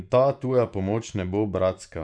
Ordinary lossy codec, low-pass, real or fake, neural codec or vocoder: none; 9.9 kHz; fake; vocoder, 24 kHz, 100 mel bands, Vocos